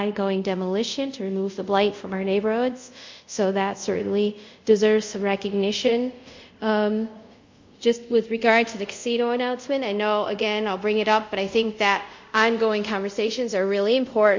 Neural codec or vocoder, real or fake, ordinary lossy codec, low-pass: codec, 24 kHz, 0.5 kbps, DualCodec; fake; MP3, 48 kbps; 7.2 kHz